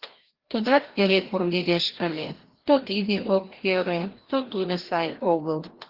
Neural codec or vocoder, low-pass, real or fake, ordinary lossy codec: codec, 16 kHz, 1 kbps, FreqCodec, larger model; 5.4 kHz; fake; Opus, 16 kbps